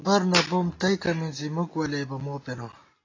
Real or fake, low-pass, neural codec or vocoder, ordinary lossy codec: real; 7.2 kHz; none; AAC, 32 kbps